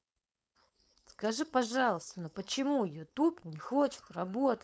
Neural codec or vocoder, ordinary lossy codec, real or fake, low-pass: codec, 16 kHz, 4.8 kbps, FACodec; none; fake; none